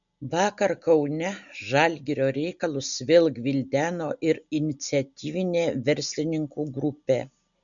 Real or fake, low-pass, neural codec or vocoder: real; 7.2 kHz; none